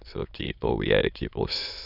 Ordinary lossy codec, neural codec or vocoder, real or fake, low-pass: none; autoencoder, 22.05 kHz, a latent of 192 numbers a frame, VITS, trained on many speakers; fake; 5.4 kHz